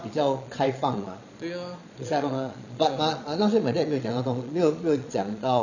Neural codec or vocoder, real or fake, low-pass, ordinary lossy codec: vocoder, 22.05 kHz, 80 mel bands, Vocos; fake; 7.2 kHz; none